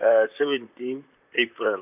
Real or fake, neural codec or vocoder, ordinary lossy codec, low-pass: fake; vocoder, 44.1 kHz, 128 mel bands, Pupu-Vocoder; none; 3.6 kHz